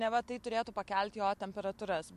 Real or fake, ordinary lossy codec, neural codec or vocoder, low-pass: real; MP3, 64 kbps; none; 14.4 kHz